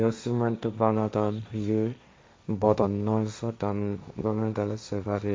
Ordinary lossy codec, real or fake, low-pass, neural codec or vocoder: none; fake; none; codec, 16 kHz, 1.1 kbps, Voila-Tokenizer